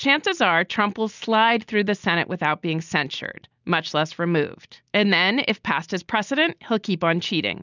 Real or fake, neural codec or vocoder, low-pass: real; none; 7.2 kHz